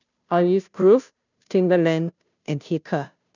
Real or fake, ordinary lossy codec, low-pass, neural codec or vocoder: fake; none; 7.2 kHz; codec, 16 kHz, 0.5 kbps, FunCodec, trained on Chinese and English, 25 frames a second